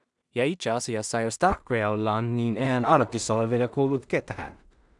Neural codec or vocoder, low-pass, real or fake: codec, 16 kHz in and 24 kHz out, 0.4 kbps, LongCat-Audio-Codec, two codebook decoder; 10.8 kHz; fake